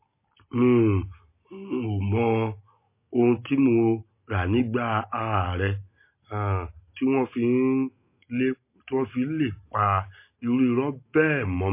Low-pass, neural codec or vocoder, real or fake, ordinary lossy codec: 3.6 kHz; none; real; MP3, 24 kbps